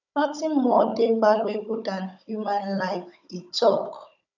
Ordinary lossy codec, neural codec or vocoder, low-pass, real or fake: none; codec, 16 kHz, 16 kbps, FunCodec, trained on Chinese and English, 50 frames a second; 7.2 kHz; fake